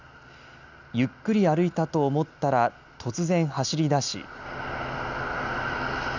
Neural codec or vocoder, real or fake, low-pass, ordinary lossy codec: none; real; 7.2 kHz; none